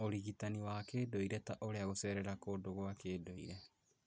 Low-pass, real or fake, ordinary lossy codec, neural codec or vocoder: none; real; none; none